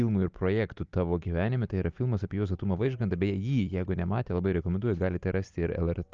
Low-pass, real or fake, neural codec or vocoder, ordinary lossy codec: 7.2 kHz; real; none; Opus, 24 kbps